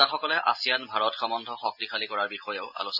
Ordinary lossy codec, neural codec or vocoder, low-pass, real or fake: none; none; 5.4 kHz; real